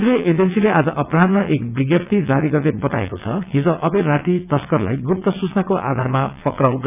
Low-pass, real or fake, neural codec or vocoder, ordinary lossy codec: 3.6 kHz; fake; vocoder, 22.05 kHz, 80 mel bands, WaveNeXt; none